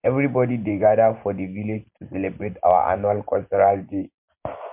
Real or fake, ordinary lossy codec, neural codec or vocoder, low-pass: real; MP3, 32 kbps; none; 3.6 kHz